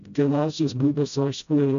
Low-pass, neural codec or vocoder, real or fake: 7.2 kHz; codec, 16 kHz, 0.5 kbps, FreqCodec, smaller model; fake